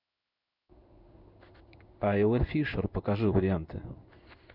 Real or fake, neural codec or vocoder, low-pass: fake; codec, 16 kHz in and 24 kHz out, 1 kbps, XY-Tokenizer; 5.4 kHz